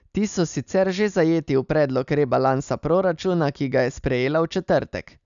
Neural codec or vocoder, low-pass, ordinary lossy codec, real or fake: none; 7.2 kHz; none; real